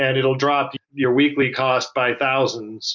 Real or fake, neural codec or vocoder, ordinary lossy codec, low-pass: real; none; MP3, 64 kbps; 7.2 kHz